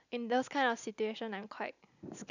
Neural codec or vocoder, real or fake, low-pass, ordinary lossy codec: none; real; 7.2 kHz; none